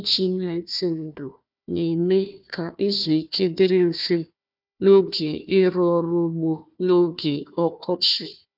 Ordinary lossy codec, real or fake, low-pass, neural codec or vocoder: none; fake; 5.4 kHz; codec, 16 kHz, 1 kbps, FunCodec, trained on Chinese and English, 50 frames a second